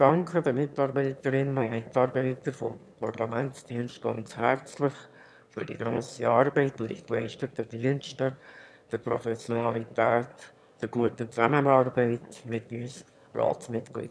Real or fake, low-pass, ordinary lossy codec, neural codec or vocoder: fake; none; none; autoencoder, 22.05 kHz, a latent of 192 numbers a frame, VITS, trained on one speaker